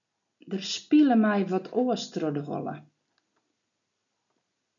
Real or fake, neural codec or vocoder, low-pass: real; none; 7.2 kHz